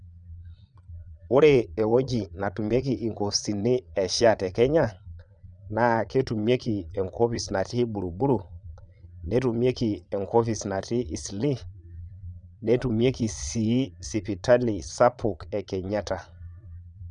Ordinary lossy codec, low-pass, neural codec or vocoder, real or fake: none; 9.9 kHz; vocoder, 22.05 kHz, 80 mel bands, Vocos; fake